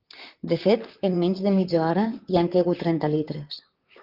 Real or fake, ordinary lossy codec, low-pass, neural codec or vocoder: fake; Opus, 24 kbps; 5.4 kHz; vocoder, 44.1 kHz, 128 mel bands, Pupu-Vocoder